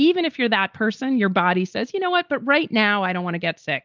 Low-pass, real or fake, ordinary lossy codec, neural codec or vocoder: 7.2 kHz; real; Opus, 32 kbps; none